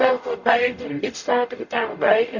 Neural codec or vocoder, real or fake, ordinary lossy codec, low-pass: codec, 44.1 kHz, 0.9 kbps, DAC; fake; none; 7.2 kHz